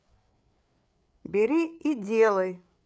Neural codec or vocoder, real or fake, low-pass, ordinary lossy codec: codec, 16 kHz, 8 kbps, FreqCodec, larger model; fake; none; none